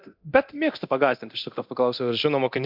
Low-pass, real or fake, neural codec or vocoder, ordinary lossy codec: 5.4 kHz; fake; codec, 24 kHz, 0.9 kbps, DualCodec; MP3, 48 kbps